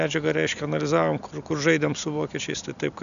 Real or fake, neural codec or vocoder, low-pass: real; none; 7.2 kHz